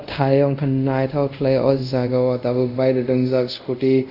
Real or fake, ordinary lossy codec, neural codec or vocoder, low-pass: fake; none; codec, 24 kHz, 0.5 kbps, DualCodec; 5.4 kHz